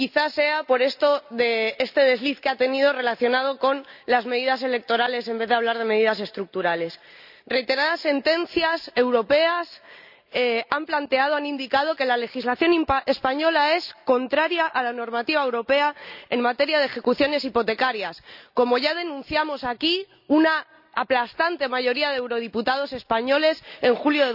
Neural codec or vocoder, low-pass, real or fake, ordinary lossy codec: none; 5.4 kHz; real; none